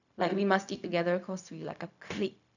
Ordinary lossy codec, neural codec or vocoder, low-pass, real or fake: none; codec, 16 kHz, 0.4 kbps, LongCat-Audio-Codec; 7.2 kHz; fake